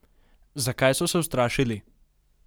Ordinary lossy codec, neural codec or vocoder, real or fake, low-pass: none; none; real; none